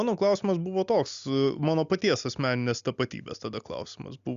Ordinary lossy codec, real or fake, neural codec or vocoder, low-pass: Opus, 64 kbps; real; none; 7.2 kHz